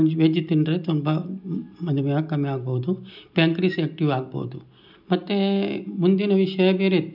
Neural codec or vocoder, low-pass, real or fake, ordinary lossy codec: none; 5.4 kHz; real; none